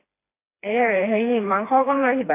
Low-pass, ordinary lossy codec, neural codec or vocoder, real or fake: 3.6 kHz; AAC, 24 kbps; codec, 16 kHz, 2 kbps, FreqCodec, smaller model; fake